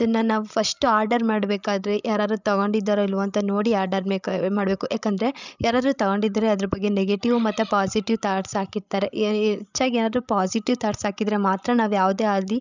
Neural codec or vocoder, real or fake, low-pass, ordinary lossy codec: codec, 16 kHz, 16 kbps, FreqCodec, larger model; fake; 7.2 kHz; none